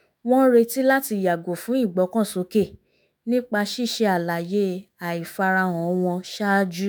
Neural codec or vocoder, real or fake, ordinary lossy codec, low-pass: autoencoder, 48 kHz, 128 numbers a frame, DAC-VAE, trained on Japanese speech; fake; none; none